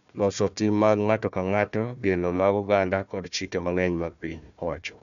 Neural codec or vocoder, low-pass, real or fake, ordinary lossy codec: codec, 16 kHz, 1 kbps, FunCodec, trained on Chinese and English, 50 frames a second; 7.2 kHz; fake; none